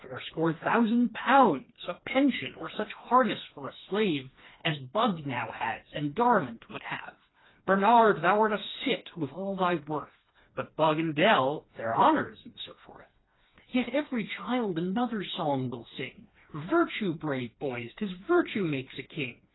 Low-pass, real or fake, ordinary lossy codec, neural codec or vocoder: 7.2 kHz; fake; AAC, 16 kbps; codec, 16 kHz, 2 kbps, FreqCodec, smaller model